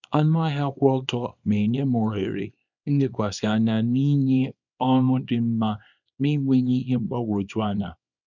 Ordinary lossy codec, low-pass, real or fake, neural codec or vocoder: none; 7.2 kHz; fake; codec, 24 kHz, 0.9 kbps, WavTokenizer, small release